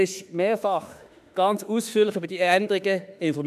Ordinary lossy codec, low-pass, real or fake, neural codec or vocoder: none; 14.4 kHz; fake; autoencoder, 48 kHz, 32 numbers a frame, DAC-VAE, trained on Japanese speech